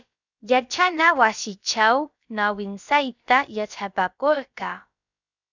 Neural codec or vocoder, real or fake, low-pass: codec, 16 kHz, about 1 kbps, DyCAST, with the encoder's durations; fake; 7.2 kHz